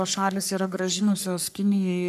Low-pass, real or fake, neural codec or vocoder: 14.4 kHz; fake; codec, 44.1 kHz, 3.4 kbps, Pupu-Codec